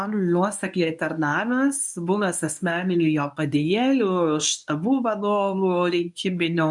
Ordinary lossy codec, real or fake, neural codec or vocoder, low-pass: MP3, 96 kbps; fake; codec, 24 kHz, 0.9 kbps, WavTokenizer, medium speech release version 1; 10.8 kHz